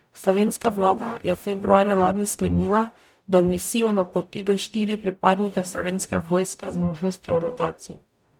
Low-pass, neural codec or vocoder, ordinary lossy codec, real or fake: 19.8 kHz; codec, 44.1 kHz, 0.9 kbps, DAC; none; fake